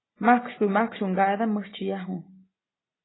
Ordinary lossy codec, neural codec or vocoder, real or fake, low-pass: AAC, 16 kbps; none; real; 7.2 kHz